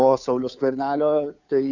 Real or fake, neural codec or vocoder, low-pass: fake; codec, 16 kHz, 2 kbps, FunCodec, trained on Chinese and English, 25 frames a second; 7.2 kHz